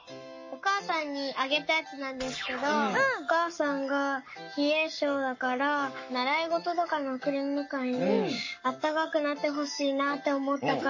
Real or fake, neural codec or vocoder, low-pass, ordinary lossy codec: fake; codec, 44.1 kHz, 7.8 kbps, Pupu-Codec; 7.2 kHz; MP3, 32 kbps